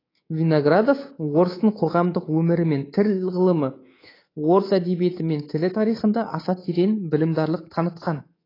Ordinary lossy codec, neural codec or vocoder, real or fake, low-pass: AAC, 24 kbps; codec, 24 kHz, 3.1 kbps, DualCodec; fake; 5.4 kHz